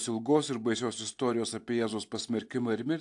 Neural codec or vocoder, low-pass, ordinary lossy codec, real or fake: none; 10.8 kHz; AAC, 64 kbps; real